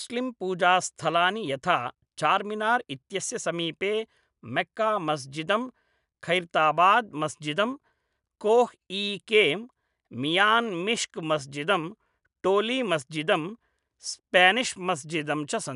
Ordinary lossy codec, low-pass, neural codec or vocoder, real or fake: none; 10.8 kHz; none; real